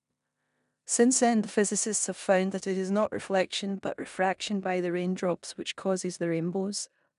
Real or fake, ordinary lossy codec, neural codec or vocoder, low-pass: fake; none; codec, 16 kHz in and 24 kHz out, 0.9 kbps, LongCat-Audio-Codec, four codebook decoder; 10.8 kHz